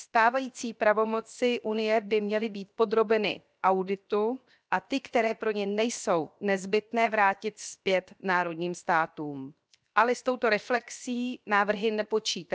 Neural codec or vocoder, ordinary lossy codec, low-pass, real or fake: codec, 16 kHz, 0.7 kbps, FocalCodec; none; none; fake